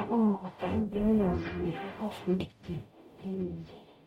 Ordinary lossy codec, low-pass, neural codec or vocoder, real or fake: MP3, 64 kbps; 19.8 kHz; codec, 44.1 kHz, 0.9 kbps, DAC; fake